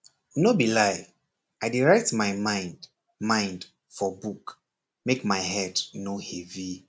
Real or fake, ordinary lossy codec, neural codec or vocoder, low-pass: real; none; none; none